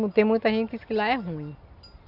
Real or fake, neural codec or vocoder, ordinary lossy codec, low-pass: real; none; none; 5.4 kHz